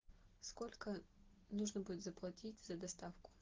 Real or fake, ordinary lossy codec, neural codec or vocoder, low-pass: real; Opus, 16 kbps; none; 7.2 kHz